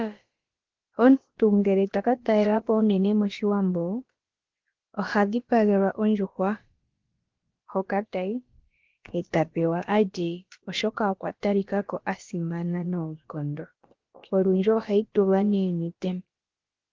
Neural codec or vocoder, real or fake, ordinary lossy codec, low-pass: codec, 16 kHz, about 1 kbps, DyCAST, with the encoder's durations; fake; Opus, 16 kbps; 7.2 kHz